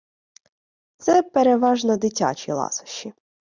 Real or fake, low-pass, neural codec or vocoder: real; 7.2 kHz; none